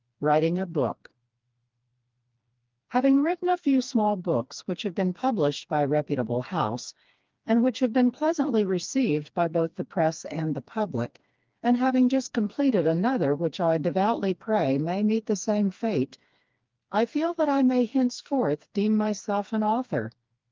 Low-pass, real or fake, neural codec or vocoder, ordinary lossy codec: 7.2 kHz; fake; codec, 16 kHz, 2 kbps, FreqCodec, smaller model; Opus, 32 kbps